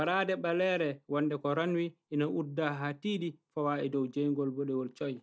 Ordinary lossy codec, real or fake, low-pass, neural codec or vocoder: none; real; none; none